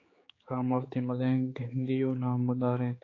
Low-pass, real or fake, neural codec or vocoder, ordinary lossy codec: 7.2 kHz; fake; codec, 16 kHz, 4 kbps, X-Codec, HuBERT features, trained on general audio; AAC, 32 kbps